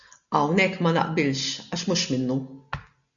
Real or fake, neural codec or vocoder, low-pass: real; none; 7.2 kHz